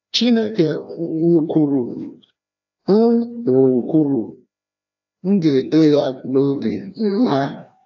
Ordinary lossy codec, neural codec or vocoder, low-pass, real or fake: AAC, 48 kbps; codec, 16 kHz, 1 kbps, FreqCodec, larger model; 7.2 kHz; fake